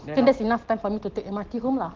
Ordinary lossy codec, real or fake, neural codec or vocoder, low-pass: Opus, 16 kbps; real; none; 7.2 kHz